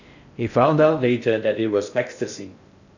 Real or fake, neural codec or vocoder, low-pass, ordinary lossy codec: fake; codec, 16 kHz in and 24 kHz out, 0.6 kbps, FocalCodec, streaming, 4096 codes; 7.2 kHz; none